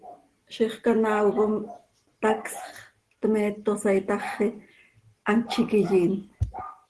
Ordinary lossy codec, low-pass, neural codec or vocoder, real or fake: Opus, 16 kbps; 10.8 kHz; none; real